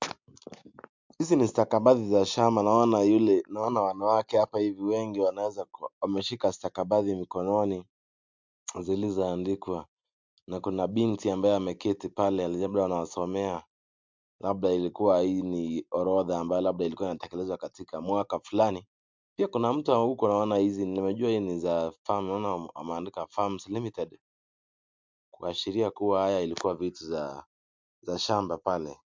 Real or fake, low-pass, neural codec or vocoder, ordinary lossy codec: real; 7.2 kHz; none; MP3, 64 kbps